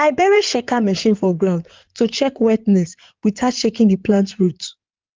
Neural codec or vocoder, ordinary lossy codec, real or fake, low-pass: codec, 16 kHz in and 24 kHz out, 2.2 kbps, FireRedTTS-2 codec; Opus, 24 kbps; fake; 7.2 kHz